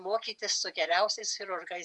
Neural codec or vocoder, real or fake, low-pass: vocoder, 48 kHz, 128 mel bands, Vocos; fake; 10.8 kHz